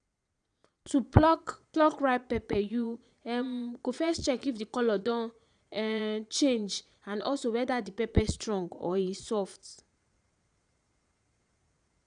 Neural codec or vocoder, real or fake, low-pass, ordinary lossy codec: vocoder, 22.05 kHz, 80 mel bands, Vocos; fake; 9.9 kHz; none